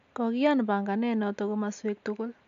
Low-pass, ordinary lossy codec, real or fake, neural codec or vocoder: 7.2 kHz; none; real; none